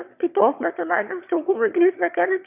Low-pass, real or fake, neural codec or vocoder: 3.6 kHz; fake; autoencoder, 22.05 kHz, a latent of 192 numbers a frame, VITS, trained on one speaker